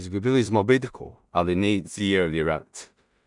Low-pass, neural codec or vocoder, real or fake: 10.8 kHz; codec, 16 kHz in and 24 kHz out, 0.4 kbps, LongCat-Audio-Codec, two codebook decoder; fake